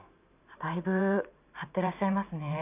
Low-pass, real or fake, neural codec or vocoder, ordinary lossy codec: 3.6 kHz; fake; vocoder, 44.1 kHz, 128 mel bands every 512 samples, BigVGAN v2; none